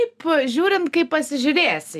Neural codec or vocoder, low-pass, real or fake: none; 14.4 kHz; real